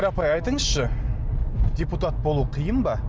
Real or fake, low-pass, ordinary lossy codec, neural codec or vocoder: real; none; none; none